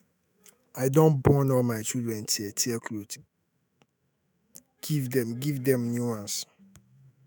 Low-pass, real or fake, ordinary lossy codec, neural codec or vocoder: none; fake; none; autoencoder, 48 kHz, 128 numbers a frame, DAC-VAE, trained on Japanese speech